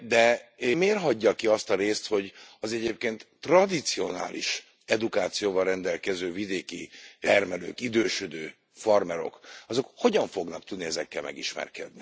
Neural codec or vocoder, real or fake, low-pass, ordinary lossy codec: none; real; none; none